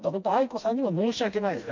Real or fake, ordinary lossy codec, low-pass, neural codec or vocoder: fake; MP3, 64 kbps; 7.2 kHz; codec, 16 kHz, 1 kbps, FreqCodec, smaller model